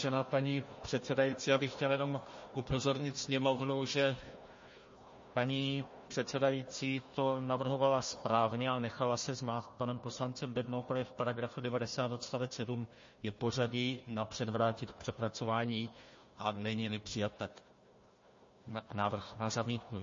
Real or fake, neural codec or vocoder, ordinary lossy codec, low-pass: fake; codec, 16 kHz, 1 kbps, FunCodec, trained on Chinese and English, 50 frames a second; MP3, 32 kbps; 7.2 kHz